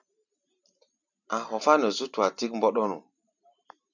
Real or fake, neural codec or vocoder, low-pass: fake; vocoder, 44.1 kHz, 128 mel bands every 256 samples, BigVGAN v2; 7.2 kHz